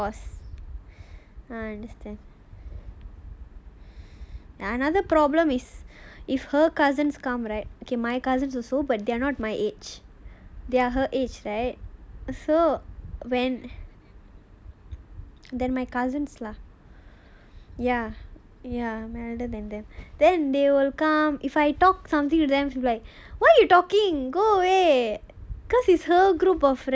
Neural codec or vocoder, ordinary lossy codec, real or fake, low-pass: none; none; real; none